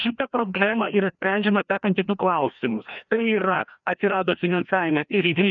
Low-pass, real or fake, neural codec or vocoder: 7.2 kHz; fake; codec, 16 kHz, 1 kbps, FreqCodec, larger model